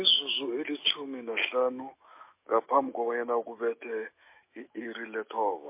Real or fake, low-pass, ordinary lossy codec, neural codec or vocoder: fake; 3.6 kHz; MP3, 24 kbps; autoencoder, 48 kHz, 128 numbers a frame, DAC-VAE, trained on Japanese speech